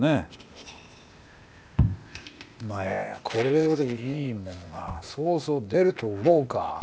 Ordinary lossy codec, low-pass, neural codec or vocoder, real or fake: none; none; codec, 16 kHz, 0.8 kbps, ZipCodec; fake